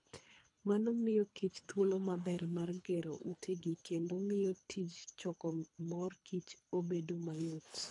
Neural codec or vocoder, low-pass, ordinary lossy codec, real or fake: codec, 24 kHz, 3 kbps, HILCodec; 10.8 kHz; AAC, 64 kbps; fake